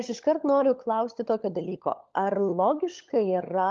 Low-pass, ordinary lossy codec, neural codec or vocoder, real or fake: 7.2 kHz; Opus, 32 kbps; codec, 16 kHz, 16 kbps, FunCodec, trained on LibriTTS, 50 frames a second; fake